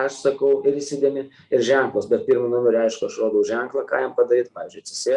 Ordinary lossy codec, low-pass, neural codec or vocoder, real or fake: Opus, 64 kbps; 10.8 kHz; none; real